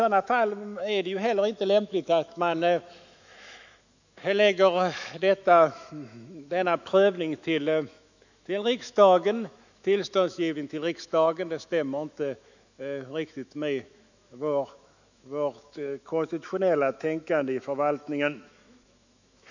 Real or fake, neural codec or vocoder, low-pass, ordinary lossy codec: fake; autoencoder, 48 kHz, 128 numbers a frame, DAC-VAE, trained on Japanese speech; 7.2 kHz; none